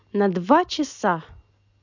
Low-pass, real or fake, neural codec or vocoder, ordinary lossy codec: 7.2 kHz; real; none; none